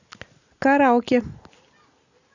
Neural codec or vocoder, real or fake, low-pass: none; real; 7.2 kHz